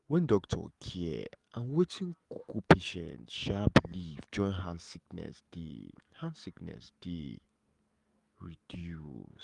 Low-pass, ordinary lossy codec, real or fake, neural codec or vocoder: 10.8 kHz; Opus, 32 kbps; fake; codec, 44.1 kHz, 7.8 kbps, DAC